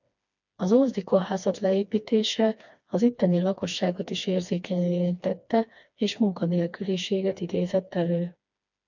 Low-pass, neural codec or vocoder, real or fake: 7.2 kHz; codec, 16 kHz, 2 kbps, FreqCodec, smaller model; fake